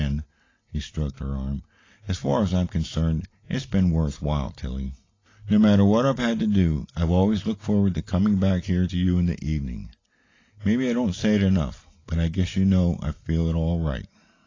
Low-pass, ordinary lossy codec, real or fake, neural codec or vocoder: 7.2 kHz; AAC, 32 kbps; real; none